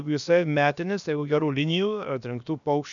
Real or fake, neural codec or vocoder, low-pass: fake; codec, 16 kHz, about 1 kbps, DyCAST, with the encoder's durations; 7.2 kHz